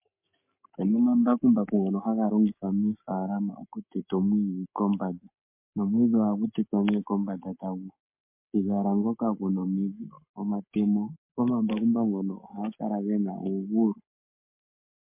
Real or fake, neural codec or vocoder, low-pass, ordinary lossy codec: fake; codec, 44.1 kHz, 7.8 kbps, Pupu-Codec; 3.6 kHz; MP3, 32 kbps